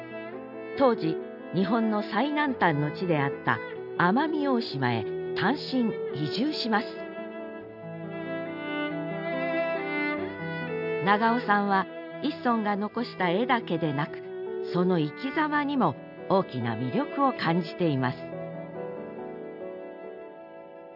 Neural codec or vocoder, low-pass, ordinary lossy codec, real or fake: none; 5.4 kHz; none; real